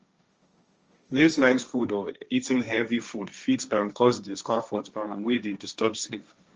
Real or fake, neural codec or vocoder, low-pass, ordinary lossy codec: fake; codec, 16 kHz, 1.1 kbps, Voila-Tokenizer; 7.2 kHz; Opus, 24 kbps